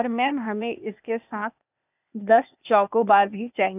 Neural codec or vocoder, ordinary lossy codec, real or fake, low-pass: codec, 16 kHz, 0.8 kbps, ZipCodec; none; fake; 3.6 kHz